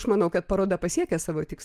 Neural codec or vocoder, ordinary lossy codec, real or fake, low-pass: none; Opus, 24 kbps; real; 14.4 kHz